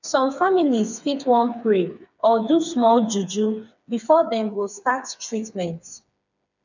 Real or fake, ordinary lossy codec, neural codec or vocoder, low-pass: fake; none; codec, 16 kHz, 4 kbps, FreqCodec, smaller model; 7.2 kHz